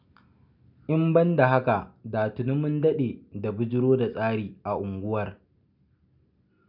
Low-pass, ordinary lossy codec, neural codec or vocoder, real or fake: 5.4 kHz; none; none; real